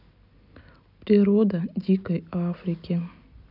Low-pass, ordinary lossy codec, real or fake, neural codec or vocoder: 5.4 kHz; none; real; none